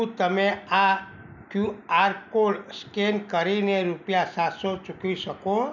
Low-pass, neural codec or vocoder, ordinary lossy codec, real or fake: 7.2 kHz; none; none; real